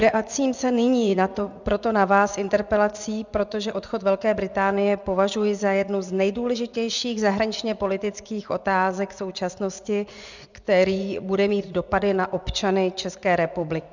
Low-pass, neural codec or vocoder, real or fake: 7.2 kHz; vocoder, 24 kHz, 100 mel bands, Vocos; fake